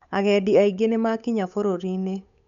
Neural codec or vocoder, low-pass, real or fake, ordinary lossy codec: codec, 16 kHz, 8 kbps, FunCodec, trained on Chinese and English, 25 frames a second; 7.2 kHz; fake; none